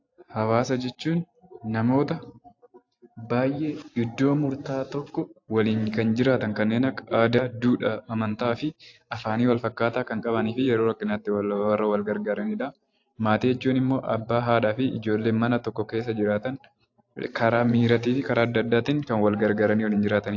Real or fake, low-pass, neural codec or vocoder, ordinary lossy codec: fake; 7.2 kHz; vocoder, 44.1 kHz, 128 mel bands every 512 samples, BigVGAN v2; AAC, 48 kbps